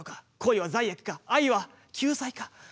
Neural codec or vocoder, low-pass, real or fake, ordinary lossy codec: none; none; real; none